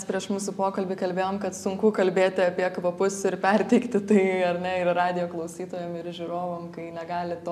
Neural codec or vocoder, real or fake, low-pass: none; real; 14.4 kHz